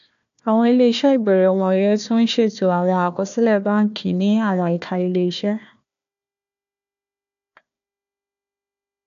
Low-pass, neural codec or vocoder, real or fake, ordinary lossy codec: 7.2 kHz; codec, 16 kHz, 1 kbps, FunCodec, trained on Chinese and English, 50 frames a second; fake; none